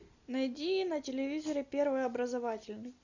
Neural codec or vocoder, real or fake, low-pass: none; real; 7.2 kHz